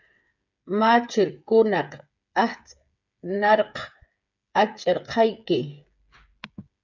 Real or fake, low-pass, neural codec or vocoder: fake; 7.2 kHz; codec, 16 kHz, 8 kbps, FreqCodec, smaller model